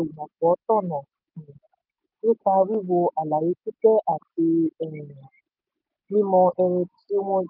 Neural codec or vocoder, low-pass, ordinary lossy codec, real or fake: none; 5.4 kHz; none; real